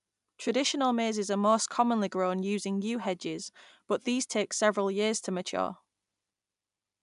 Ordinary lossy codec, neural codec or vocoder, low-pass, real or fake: none; none; 10.8 kHz; real